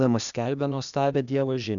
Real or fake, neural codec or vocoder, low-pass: fake; codec, 16 kHz, 0.8 kbps, ZipCodec; 7.2 kHz